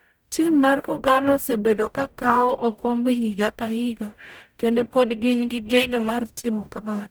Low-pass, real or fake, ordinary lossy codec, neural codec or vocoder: none; fake; none; codec, 44.1 kHz, 0.9 kbps, DAC